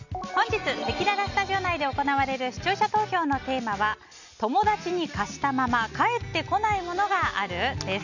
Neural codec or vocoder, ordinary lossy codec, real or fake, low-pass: none; none; real; 7.2 kHz